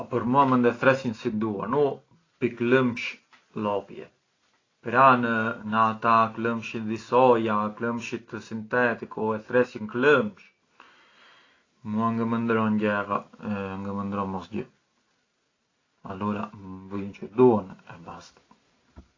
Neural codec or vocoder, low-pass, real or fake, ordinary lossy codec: none; 7.2 kHz; real; AAC, 32 kbps